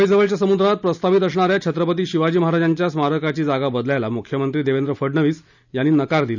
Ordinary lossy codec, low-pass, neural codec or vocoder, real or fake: none; 7.2 kHz; none; real